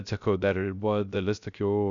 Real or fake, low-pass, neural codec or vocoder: fake; 7.2 kHz; codec, 16 kHz, 0.3 kbps, FocalCodec